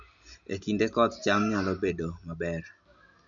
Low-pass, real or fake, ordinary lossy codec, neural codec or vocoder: 7.2 kHz; real; none; none